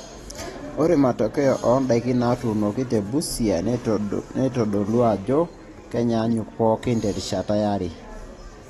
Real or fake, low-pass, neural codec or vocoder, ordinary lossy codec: real; 19.8 kHz; none; AAC, 32 kbps